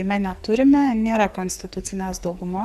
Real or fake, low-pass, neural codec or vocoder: fake; 14.4 kHz; codec, 44.1 kHz, 2.6 kbps, SNAC